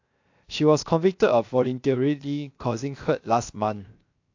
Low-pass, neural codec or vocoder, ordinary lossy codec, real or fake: 7.2 kHz; codec, 16 kHz, 0.7 kbps, FocalCodec; AAC, 48 kbps; fake